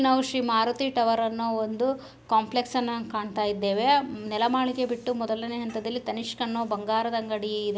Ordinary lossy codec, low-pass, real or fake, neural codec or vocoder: none; none; real; none